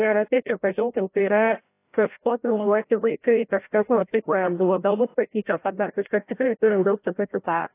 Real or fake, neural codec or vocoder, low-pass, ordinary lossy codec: fake; codec, 16 kHz, 0.5 kbps, FreqCodec, larger model; 3.6 kHz; AAC, 24 kbps